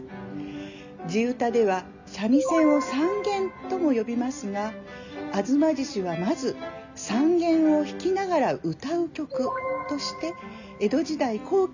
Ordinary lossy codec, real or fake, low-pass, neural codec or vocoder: none; real; 7.2 kHz; none